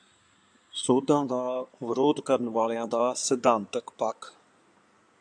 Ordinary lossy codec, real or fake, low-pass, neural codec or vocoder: AAC, 64 kbps; fake; 9.9 kHz; codec, 16 kHz in and 24 kHz out, 2.2 kbps, FireRedTTS-2 codec